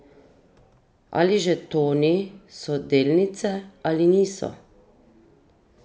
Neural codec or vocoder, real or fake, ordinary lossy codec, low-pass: none; real; none; none